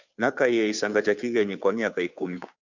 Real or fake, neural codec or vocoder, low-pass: fake; codec, 16 kHz, 2 kbps, FunCodec, trained on Chinese and English, 25 frames a second; 7.2 kHz